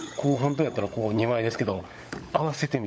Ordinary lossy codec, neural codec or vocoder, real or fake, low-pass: none; codec, 16 kHz, 16 kbps, FunCodec, trained on LibriTTS, 50 frames a second; fake; none